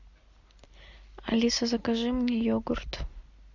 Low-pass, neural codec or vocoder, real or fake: 7.2 kHz; none; real